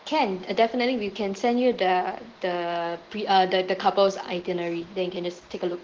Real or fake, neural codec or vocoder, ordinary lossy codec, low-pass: real; none; Opus, 16 kbps; 7.2 kHz